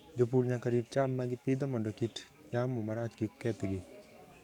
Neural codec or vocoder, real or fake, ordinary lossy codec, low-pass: codec, 44.1 kHz, 7.8 kbps, DAC; fake; none; 19.8 kHz